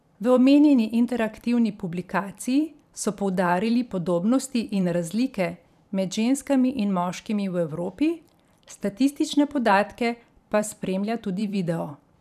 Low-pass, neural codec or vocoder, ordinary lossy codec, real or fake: 14.4 kHz; vocoder, 44.1 kHz, 128 mel bands every 512 samples, BigVGAN v2; none; fake